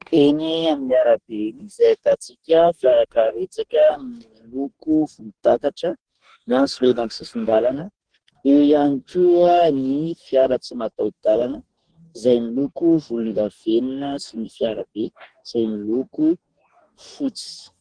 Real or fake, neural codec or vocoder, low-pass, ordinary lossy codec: fake; codec, 44.1 kHz, 2.6 kbps, DAC; 9.9 kHz; Opus, 16 kbps